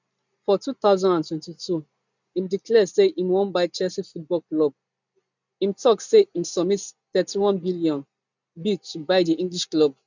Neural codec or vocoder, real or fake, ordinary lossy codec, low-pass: none; real; none; 7.2 kHz